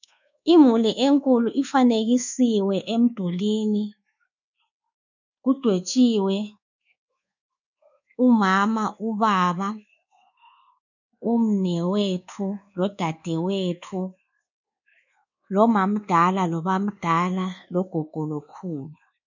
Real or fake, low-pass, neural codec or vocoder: fake; 7.2 kHz; codec, 24 kHz, 1.2 kbps, DualCodec